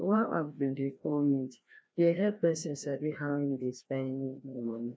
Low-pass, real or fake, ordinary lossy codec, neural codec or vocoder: none; fake; none; codec, 16 kHz, 1 kbps, FreqCodec, larger model